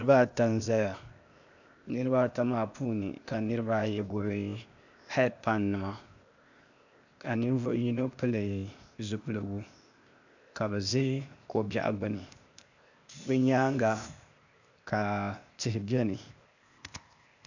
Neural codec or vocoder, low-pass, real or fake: codec, 16 kHz, 0.8 kbps, ZipCodec; 7.2 kHz; fake